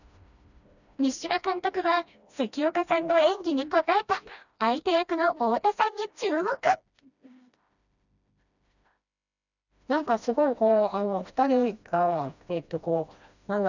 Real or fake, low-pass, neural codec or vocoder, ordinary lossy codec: fake; 7.2 kHz; codec, 16 kHz, 1 kbps, FreqCodec, smaller model; none